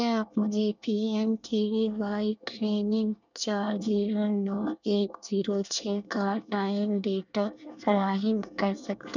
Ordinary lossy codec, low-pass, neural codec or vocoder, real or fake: none; 7.2 kHz; codec, 24 kHz, 1 kbps, SNAC; fake